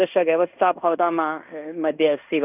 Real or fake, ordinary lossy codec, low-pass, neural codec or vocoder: fake; none; 3.6 kHz; codec, 16 kHz in and 24 kHz out, 0.9 kbps, LongCat-Audio-Codec, fine tuned four codebook decoder